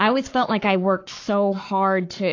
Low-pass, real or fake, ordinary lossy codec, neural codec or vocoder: 7.2 kHz; fake; AAC, 48 kbps; codec, 44.1 kHz, 7.8 kbps, Pupu-Codec